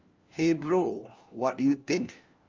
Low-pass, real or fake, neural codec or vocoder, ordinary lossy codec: 7.2 kHz; fake; codec, 16 kHz, 1 kbps, FunCodec, trained on LibriTTS, 50 frames a second; Opus, 32 kbps